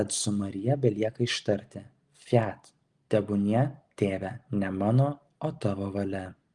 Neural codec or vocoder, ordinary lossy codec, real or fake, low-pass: none; Opus, 24 kbps; real; 10.8 kHz